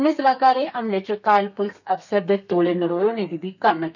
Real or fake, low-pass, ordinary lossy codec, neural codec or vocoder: fake; 7.2 kHz; none; codec, 32 kHz, 1.9 kbps, SNAC